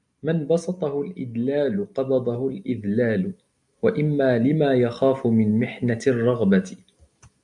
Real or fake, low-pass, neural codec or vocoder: real; 10.8 kHz; none